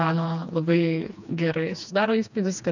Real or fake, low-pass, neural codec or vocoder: fake; 7.2 kHz; codec, 16 kHz, 2 kbps, FreqCodec, smaller model